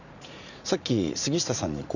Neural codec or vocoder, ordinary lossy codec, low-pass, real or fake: vocoder, 44.1 kHz, 80 mel bands, Vocos; none; 7.2 kHz; fake